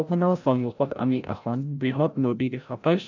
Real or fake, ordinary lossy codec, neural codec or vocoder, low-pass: fake; none; codec, 16 kHz, 0.5 kbps, FreqCodec, larger model; 7.2 kHz